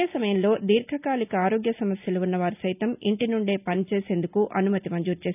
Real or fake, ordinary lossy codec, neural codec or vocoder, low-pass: real; none; none; 3.6 kHz